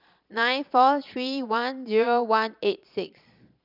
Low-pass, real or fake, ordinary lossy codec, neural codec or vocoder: 5.4 kHz; fake; none; vocoder, 22.05 kHz, 80 mel bands, Vocos